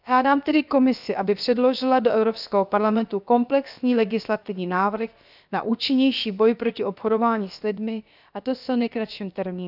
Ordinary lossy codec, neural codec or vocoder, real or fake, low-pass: none; codec, 16 kHz, about 1 kbps, DyCAST, with the encoder's durations; fake; 5.4 kHz